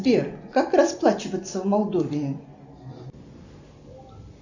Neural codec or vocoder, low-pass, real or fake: none; 7.2 kHz; real